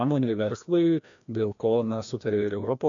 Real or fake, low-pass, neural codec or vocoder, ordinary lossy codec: fake; 7.2 kHz; codec, 16 kHz, 1 kbps, FreqCodec, larger model; MP3, 64 kbps